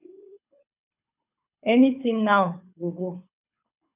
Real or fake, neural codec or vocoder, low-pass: fake; codec, 24 kHz, 6 kbps, HILCodec; 3.6 kHz